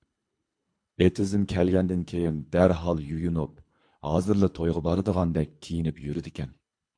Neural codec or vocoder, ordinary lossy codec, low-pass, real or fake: codec, 24 kHz, 3 kbps, HILCodec; MP3, 64 kbps; 9.9 kHz; fake